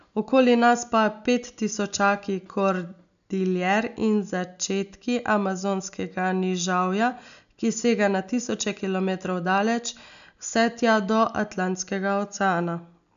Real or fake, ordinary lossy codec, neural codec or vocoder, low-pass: real; none; none; 7.2 kHz